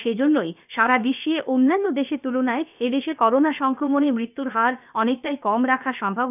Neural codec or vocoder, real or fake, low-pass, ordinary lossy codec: codec, 16 kHz, about 1 kbps, DyCAST, with the encoder's durations; fake; 3.6 kHz; none